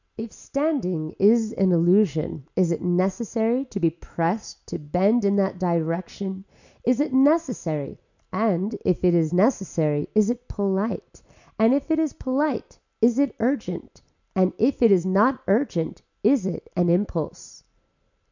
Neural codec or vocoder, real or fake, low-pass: none; real; 7.2 kHz